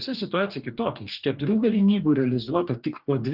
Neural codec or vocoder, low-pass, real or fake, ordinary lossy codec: codec, 44.1 kHz, 2.6 kbps, DAC; 5.4 kHz; fake; Opus, 32 kbps